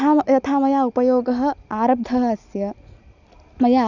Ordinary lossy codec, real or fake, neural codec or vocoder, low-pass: none; real; none; 7.2 kHz